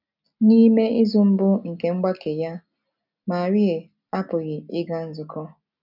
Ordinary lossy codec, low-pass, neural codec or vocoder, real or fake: none; 5.4 kHz; none; real